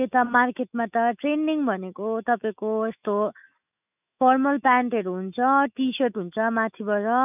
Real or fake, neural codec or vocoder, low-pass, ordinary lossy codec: fake; autoencoder, 48 kHz, 128 numbers a frame, DAC-VAE, trained on Japanese speech; 3.6 kHz; none